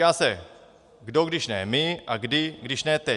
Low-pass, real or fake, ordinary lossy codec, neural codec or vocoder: 10.8 kHz; real; Opus, 64 kbps; none